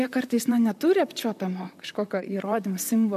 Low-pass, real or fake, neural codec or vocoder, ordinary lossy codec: 14.4 kHz; fake; vocoder, 44.1 kHz, 128 mel bands, Pupu-Vocoder; MP3, 96 kbps